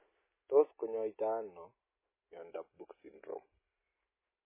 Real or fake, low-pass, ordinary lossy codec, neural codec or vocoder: real; 3.6 kHz; MP3, 16 kbps; none